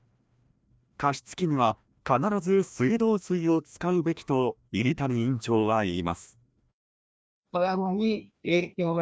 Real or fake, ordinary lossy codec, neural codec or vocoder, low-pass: fake; none; codec, 16 kHz, 1 kbps, FreqCodec, larger model; none